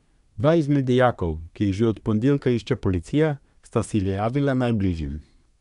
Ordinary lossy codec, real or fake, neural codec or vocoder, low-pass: none; fake; codec, 24 kHz, 1 kbps, SNAC; 10.8 kHz